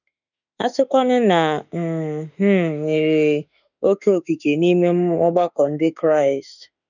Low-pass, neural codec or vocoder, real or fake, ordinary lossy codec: 7.2 kHz; autoencoder, 48 kHz, 32 numbers a frame, DAC-VAE, trained on Japanese speech; fake; none